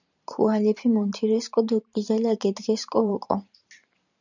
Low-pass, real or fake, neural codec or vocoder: 7.2 kHz; fake; vocoder, 44.1 kHz, 128 mel bands every 512 samples, BigVGAN v2